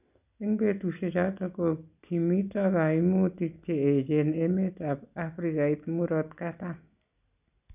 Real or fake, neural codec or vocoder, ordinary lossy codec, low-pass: real; none; none; 3.6 kHz